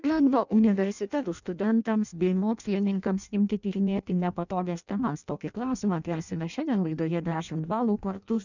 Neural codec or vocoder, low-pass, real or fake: codec, 16 kHz in and 24 kHz out, 0.6 kbps, FireRedTTS-2 codec; 7.2 kHz; fake